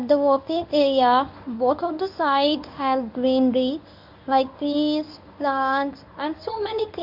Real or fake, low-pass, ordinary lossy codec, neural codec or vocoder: fake; 5.4 kHz; MP3, 48 kbps; codec, 24 kHz, 0.9 kbps, WavTokenizer, medium speech release version 1